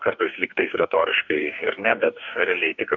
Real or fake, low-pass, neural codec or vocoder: fake; 7.2 kHz; codec, 44.1 kHz, 2.6 kbps, DAC